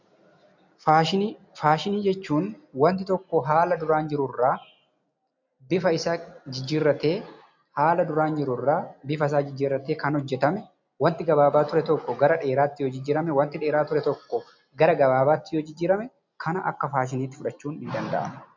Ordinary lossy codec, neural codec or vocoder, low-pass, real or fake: MP3, 64 kbps; none; 7.2 kHz; real